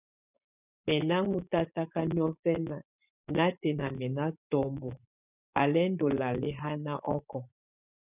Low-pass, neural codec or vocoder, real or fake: 3.6 kHz; vocoder, 24 kHz, 100 mel bands, Vocos; fake